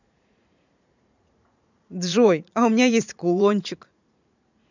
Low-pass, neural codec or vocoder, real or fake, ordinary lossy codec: 7.2 kHz; vocoder, 44.1 kHz, 80 mel bands, Vocos; fake; none